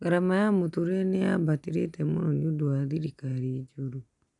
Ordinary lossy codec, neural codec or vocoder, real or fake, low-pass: none; none; real; 10.8 kHz